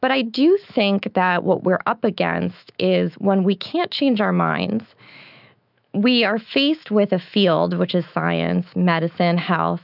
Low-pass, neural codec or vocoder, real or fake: 5.4 kHz; autoencoder, 48 kHz, 128 numbers a frame, DAC-VAE, trained on Japanese speech; fake